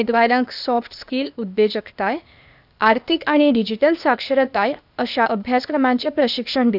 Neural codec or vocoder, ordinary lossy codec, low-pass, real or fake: codec, 16 kHz, 0.8 kbps, ZipCodec; none; 5.4 kHz; fake